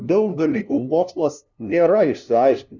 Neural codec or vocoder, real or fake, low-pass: codec, 16 kHz, 0.5 kbps, FunCodec, trained on LibriTTS, 25 frames a second; fake; 7.2 kHz